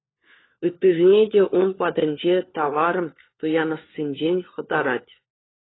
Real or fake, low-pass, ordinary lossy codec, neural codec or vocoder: fake; 7.2 kHz; AAC, 16 kbps; codec, 16 kHz, 4 kbps, FunCodec, trained on LibriTTS, 50 frames a second